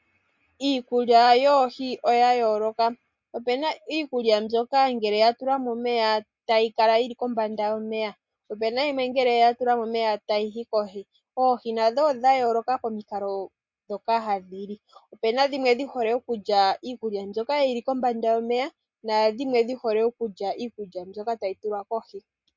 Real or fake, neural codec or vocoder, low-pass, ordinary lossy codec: real; none; 7.2 kHz; MP3, 48 kbps